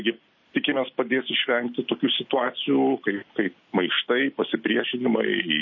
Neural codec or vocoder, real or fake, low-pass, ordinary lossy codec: none; real; 7.2 kHz; MP3, 32 kbps